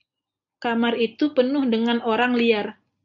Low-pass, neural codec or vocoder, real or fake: 7.2 kHz; none; real